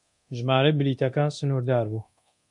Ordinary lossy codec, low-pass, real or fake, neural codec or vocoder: MP3, 64 kbps; 10.8 kHz; fake; codec, 24 kHz, 0.9 kbps, DualCodec